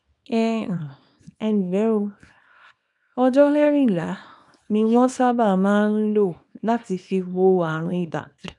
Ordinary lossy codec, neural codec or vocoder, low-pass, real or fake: none; codec, 24 kHz, 0.9 kbps, WavTokenizer, small release; 10.8 kHz; fake